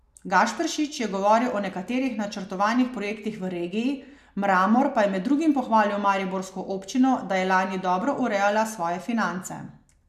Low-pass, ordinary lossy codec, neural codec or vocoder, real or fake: 14.4 kHz; MP3, 96 kbps; none; real